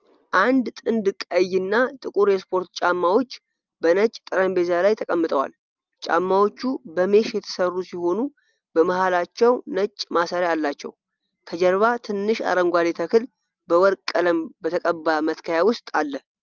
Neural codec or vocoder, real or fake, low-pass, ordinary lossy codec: none; real; 7.2 kHz; Opus, 24 kbps